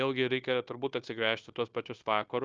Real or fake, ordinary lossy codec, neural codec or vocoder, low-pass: fake; Opus, 24 kbps; codec, 16 kHz, 0.9 kbps, LongCat-Audio-Codec; 7.2 kHz